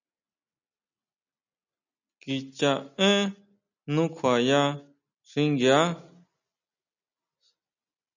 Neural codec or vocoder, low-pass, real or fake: none; 7.2 kHz; real